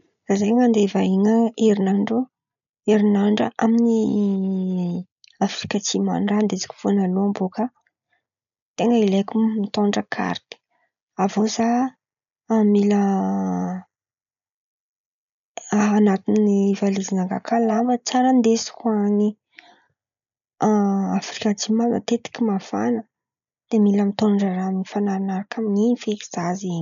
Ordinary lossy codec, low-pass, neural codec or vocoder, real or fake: none; 7.2 kHz; none; real